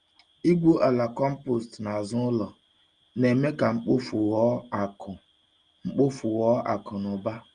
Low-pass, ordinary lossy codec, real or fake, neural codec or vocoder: 9.9 kHz; Opus, 32 kbps; real; none